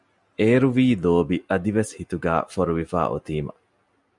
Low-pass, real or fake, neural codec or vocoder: 10.8 kHz; real; none